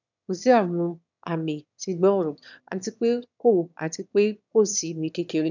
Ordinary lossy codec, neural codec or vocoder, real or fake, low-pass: none; autoencoder, 22.05 kHz, a latent of 192 numbers a frame, VITS, trained on one speaker; fake; 7.2 kHz